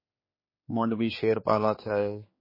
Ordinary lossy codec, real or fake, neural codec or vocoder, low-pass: MP3, 24 kbps; fake; codec, 16 kHz, 4 kbps, X-Codec, HuBERT features, trained on general audio; 5.4 kHz